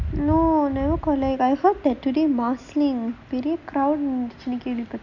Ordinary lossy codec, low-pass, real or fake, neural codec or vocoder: none; 7.2 kHz; real; none